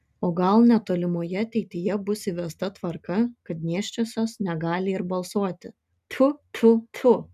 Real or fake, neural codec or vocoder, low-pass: real; none; 14.4 kHz